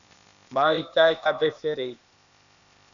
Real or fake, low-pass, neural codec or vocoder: fake; 7.2 kHz; codec, 16 kHz, 0.8 kbps, ZipCodec